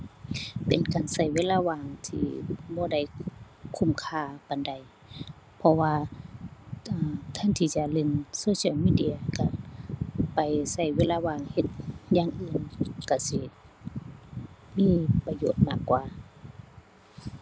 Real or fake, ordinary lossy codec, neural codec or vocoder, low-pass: real; none; none; none